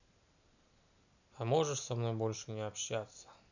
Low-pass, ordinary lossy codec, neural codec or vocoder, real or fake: 7.2 kHz; none; none; real